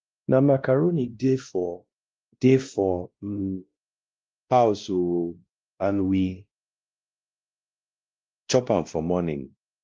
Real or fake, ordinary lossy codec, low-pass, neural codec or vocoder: fake; Opus, 32 kbps; 7.2 kHz; codec, 16 kHz, 1 kbps, X-Codec, WavLM features, trained on Multilingual LibriSpeech